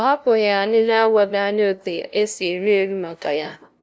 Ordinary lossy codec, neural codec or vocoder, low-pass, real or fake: none; codec, 16 kHz, 0.5 kbps, FunCodec, trained on LibriTTS, 25 frames a second; none; fake